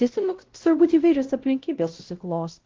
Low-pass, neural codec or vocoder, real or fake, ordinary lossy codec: 7.2 kHz; codec, 16 kHz, 0.5 kbps, X-Codec, WavLM features, trained on Multilingual LibriSpeech; fake; Opus, 16 kbps